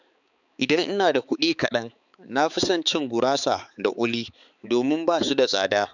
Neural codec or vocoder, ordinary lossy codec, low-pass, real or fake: codec, 16 kHz, 4 kbps, X-Codec, HuBERT features, trained on balanced general audio; none; 7.2 kHz; fake